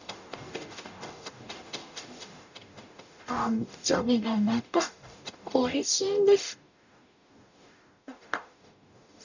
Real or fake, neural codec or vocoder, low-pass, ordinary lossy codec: fake; codec, 44.1 kHz, 0.9 kbps, DAC; 7.2 kHz; none